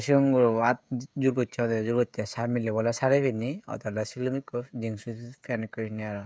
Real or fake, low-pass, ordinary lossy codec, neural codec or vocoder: fake; none; none; codec, 16 kHz, 16 kbps, FreqCodec, smaller model